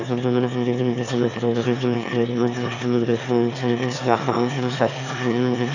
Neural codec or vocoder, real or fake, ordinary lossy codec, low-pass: autoencoder, 22.05 kHz, a latent of 192 numbers a frame, VITS, trained on one speaker; fake; none; 7.2 kHz